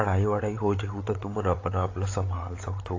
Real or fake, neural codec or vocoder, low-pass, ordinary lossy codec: fake; codec, 16 kHz, 8 kbps, FreqCodec, larger model; 7.2 kHz; AAC, 32 kbps